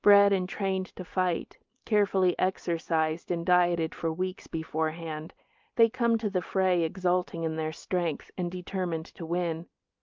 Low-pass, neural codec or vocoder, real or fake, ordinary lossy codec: 7.2 kHz; none; real; Opus, 24 kbps